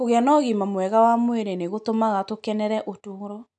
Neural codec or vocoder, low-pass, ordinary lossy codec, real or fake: none; 9.9 kHz; none; real